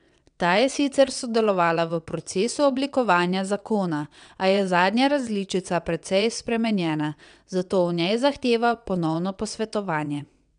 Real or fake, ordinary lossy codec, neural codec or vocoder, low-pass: fake; none; vocoder, 22.05 kHz, 80 mel bands, WaveNeXt; 9.9 kHz